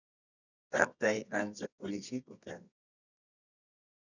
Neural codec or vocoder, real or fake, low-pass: codec, 24 kHz, 0.9 kbps, WavTokenizer, medium music audio release; fake; 7.2 kHz